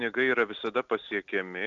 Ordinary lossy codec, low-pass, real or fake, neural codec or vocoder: Opus, 64 kbps; 7.2 kHz; real; none